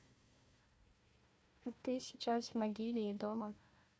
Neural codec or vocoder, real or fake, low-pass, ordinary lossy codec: codec, 16 kHz, 1 kbps, FunCodec, trained on Chinese and English, 50 frames a second; fake; none; none